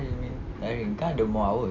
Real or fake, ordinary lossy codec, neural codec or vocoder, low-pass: real; none; none; 7.2 kHz